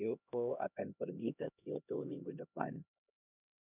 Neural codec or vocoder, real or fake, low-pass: codec, 16 kHz, 1 kbps, X-Codec, HuBERT features, trained on LibriSpeech; fake; 3.6 kHz